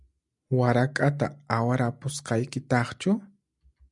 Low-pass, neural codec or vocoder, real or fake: 10.8 kHz; none; real